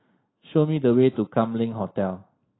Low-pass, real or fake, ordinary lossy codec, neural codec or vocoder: 7.2 kHz; real; AAC, 16 kbps; none